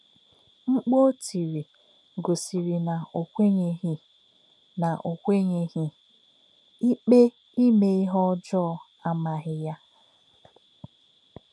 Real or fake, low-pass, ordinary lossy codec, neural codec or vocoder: real; none; none; none